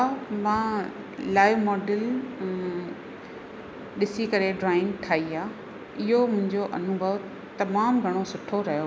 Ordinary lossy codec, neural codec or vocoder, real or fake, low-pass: none; none; real; none